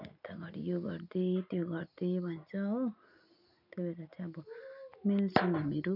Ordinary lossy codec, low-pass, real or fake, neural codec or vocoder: MP3, 48 kbps; 5.4 kHz; real; none